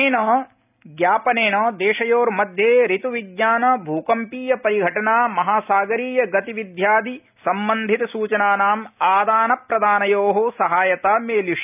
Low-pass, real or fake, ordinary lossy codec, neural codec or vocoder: 3.6 kHz; real; none; none